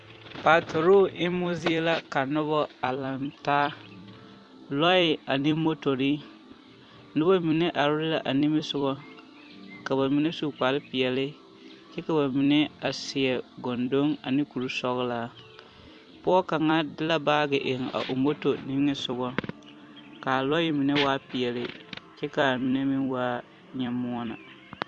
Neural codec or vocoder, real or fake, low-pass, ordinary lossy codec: vocoder, 44.1 kHz, 128 mel bands every 256 samples, BigVGAN v2; fake; 10.8 kHz; MP3, 64 kbps